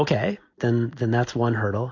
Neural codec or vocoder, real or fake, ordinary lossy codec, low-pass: none; real; AAC, 48 kbps; 7.2 kHz